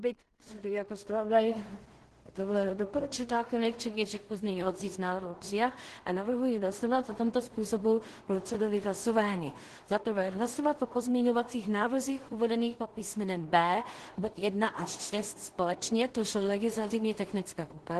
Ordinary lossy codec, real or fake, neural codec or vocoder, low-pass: Opus, 16 kbps; fake; codec, 16 kHz in and 24 kHz out, 0.4 kbps, LongCat-Audio-Codec, two codebook decoder; 10.8 kHz